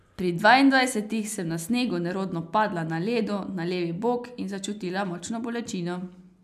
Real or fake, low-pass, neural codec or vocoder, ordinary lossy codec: real; 14.4 kHz; none; AAC, 96 kbps